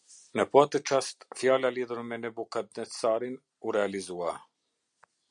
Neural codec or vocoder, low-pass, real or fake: none; 9.9 kHz; real